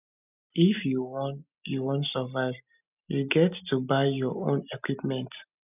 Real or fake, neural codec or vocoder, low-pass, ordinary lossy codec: real; none; 3.6 kHz; none